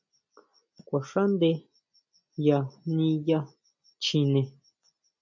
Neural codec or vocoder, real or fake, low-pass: none; real; 7.2 kHz